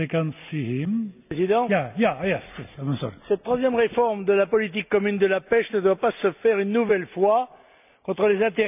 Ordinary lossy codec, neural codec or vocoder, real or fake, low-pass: none; none; real; 3.6 kHz